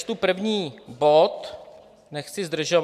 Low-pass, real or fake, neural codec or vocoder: 14.4 kHz; real; none